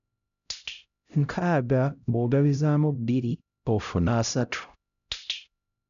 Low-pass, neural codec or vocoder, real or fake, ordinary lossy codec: 7.2 kHz; codec, 16 kHz, 0.5 kbps, X-Codec, HuBERT features, trained on LibriSpeech; fake; none